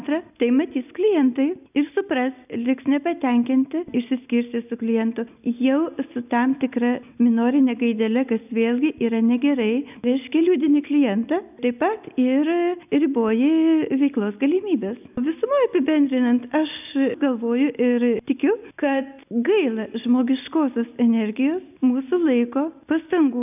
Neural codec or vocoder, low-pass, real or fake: none; 3.6 kHz; real